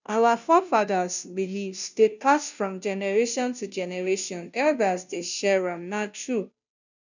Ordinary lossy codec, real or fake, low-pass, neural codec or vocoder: none; fake; 7.2 kHz; codec, 16 kHz, 0.5 kbps, FunCodec, trained on Chinese and English, 25 frames a second